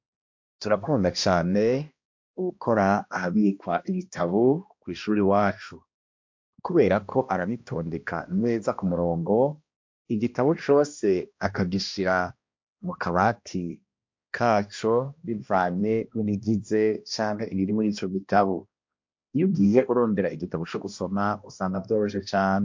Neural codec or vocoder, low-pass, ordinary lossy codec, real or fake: codec, 16 kHz, 1 kbps, X-Codec, HuBERT features, trained on balanced general audio; 7.2 kHz; MP3, 48 kbps; fake